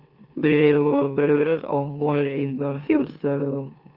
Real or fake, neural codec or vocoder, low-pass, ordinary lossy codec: fake; autoencoder, 44.1 kHz, a latent of 192 numbers a frame, MeloTTS; 5.4 kHz; Opus, 32 kbps